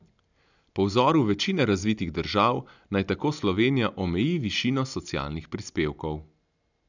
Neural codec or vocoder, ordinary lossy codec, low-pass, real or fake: none; none; 7.2 kHz; real